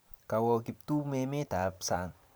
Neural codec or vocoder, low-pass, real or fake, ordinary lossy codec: vocoder, 44.1 kHz, 128 mel bands every 512 samples, BigVGAN v2; none; fake; none